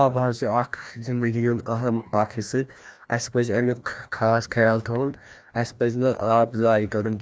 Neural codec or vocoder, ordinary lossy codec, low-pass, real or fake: codec, 16 kHz, 1 kbps, FreqCodec, larger model; none; none; fake